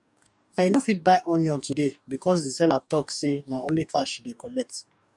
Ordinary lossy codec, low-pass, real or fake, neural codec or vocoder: none; 10.8 kHz; fake; codec, 44.1 kHz, 2.6 kbps, DAC